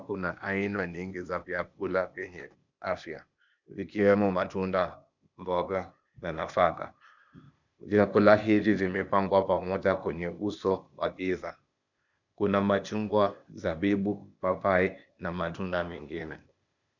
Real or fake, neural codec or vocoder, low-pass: fake; codec, 16 kHz, 0.8 kbps, ZipCodec; 7.2 kHz